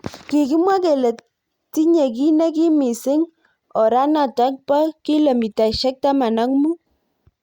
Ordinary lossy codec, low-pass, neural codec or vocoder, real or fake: Opus, 64 kbps; 19.8 kHz; none; real